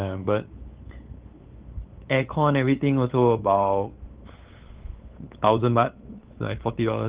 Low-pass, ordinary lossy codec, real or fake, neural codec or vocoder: 3.6 kHz; Opus, 16 kbps; fake; codec, 16 kHz, 8 kbps, FunCodec, trained on LibriTTS, 25 frames a second